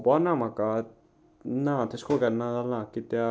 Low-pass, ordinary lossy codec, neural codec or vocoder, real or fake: none; none; none; real